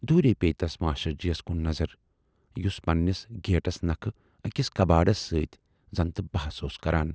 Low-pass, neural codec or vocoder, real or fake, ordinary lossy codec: none; none; real; none